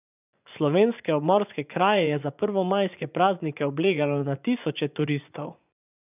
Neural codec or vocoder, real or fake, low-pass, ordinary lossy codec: vocoder, 24 kHz, 100 mel bands, Vocos; fake; 3.6 kHz; none